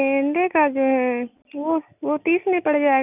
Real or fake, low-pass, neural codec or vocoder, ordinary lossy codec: real; 3.6 kHz; none; none